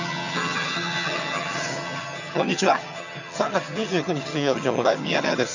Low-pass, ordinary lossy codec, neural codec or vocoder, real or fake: 7.2 kHz; none; vocoder, 22.05 kHz, 80 mel bands, HiFi-GAN; fake